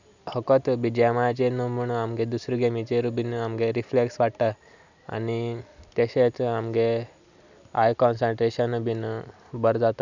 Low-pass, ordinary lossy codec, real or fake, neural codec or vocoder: 7.2 kHz; none; real; none